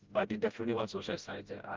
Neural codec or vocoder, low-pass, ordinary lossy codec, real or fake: codec, 16 kHz, 0.5 kbps, FreqCodec, smaller model; 7.2 kHz; Opus, 32 kbps; fake